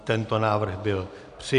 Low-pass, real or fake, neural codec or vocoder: 10.8 kHz; real; none